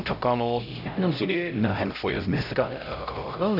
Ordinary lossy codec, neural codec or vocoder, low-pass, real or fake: none; codec, 16 kHz, 0.5 kbps, X-Codec, HuBERT features, trained on LibriSpeech; 5.4 kHz; fake